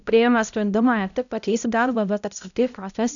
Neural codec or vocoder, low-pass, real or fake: codec, 16 kHz, 0.5 kbps, X-Codec, HuBERT features, trained on balanced general audio; 7.2 kHz; fake